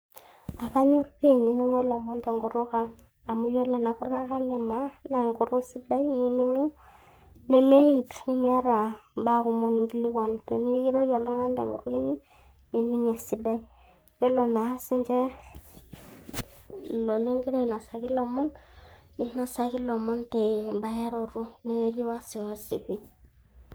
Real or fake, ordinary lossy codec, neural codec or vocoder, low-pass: fake; none; codec, 44.1 kHz, 3.4 kbps, Pupu-Codec; none